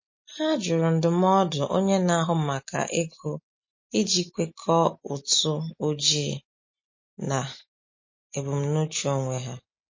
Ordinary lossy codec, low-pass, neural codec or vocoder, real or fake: MP3, 32 kbps; 7.2 kHz; none; real